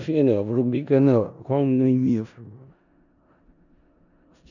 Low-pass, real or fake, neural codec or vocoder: 7.2 kHz; fake; codec, 16 kHz in and 24 kHz out, 0.4 kbps, LongCat-Audio-Codec, four codebook decoder